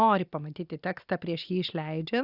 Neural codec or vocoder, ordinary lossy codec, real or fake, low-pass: none; Opus, 64 kbps; real; 5.4 kHz